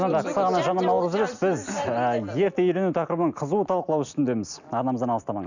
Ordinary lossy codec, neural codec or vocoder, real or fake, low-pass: none; none; real; 7.2 kHz